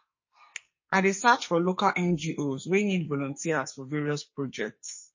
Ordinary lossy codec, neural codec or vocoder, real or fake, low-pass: MP3, 32 kbps; codec, 44.1 kHz, 2.6 kbps, SNAC; fake; 7.2 kHz